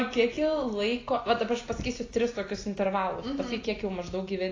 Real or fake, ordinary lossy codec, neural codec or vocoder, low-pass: real; AAC, 32 kbps; none; 7.2 kHz